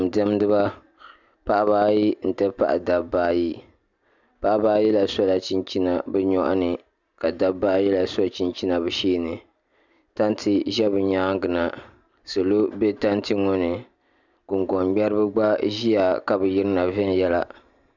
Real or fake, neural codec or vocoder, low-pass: real; none; 7.2 kHz